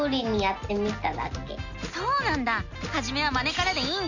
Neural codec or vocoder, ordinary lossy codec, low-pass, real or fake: none; none; 7.2 kHz; real